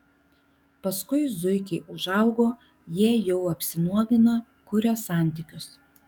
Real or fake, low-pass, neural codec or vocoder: fake; 19.8 kHz; codec, 44.1 kHz, 7.8 kbps, DAC